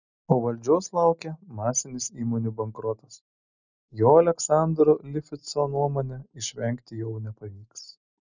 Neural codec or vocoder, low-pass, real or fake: none; 7.2 kHz; real